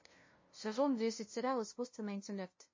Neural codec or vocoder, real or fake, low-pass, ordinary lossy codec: codec, 16 kHz, 0.5 kbps, FunCodec, trained on LibriTTS, 25 frames a second; fake; 7.2 kHz; MP3, 32 kbps